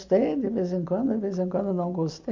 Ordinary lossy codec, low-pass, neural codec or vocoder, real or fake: MP3, 64 kbps; 7.2 kHz; none; real